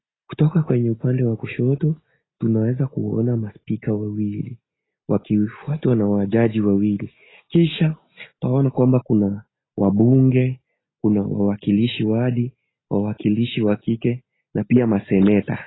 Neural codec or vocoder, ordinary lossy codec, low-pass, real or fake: none; AAC, 16 kbps; 7.2 kHz; real